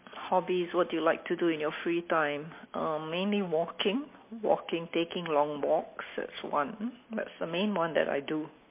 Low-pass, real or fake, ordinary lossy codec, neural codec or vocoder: 3.6 kHz; real; MP3, 24 kbps; none